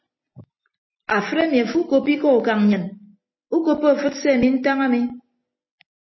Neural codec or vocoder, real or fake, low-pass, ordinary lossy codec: none; real; 7.2 kHz; MP3, 24 kbps